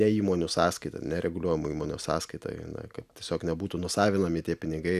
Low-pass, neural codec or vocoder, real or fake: 14.4 kHz; none; real